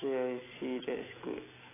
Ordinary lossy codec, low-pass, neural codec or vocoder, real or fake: AAC, 16 kbps; 3.6 kHz; none; real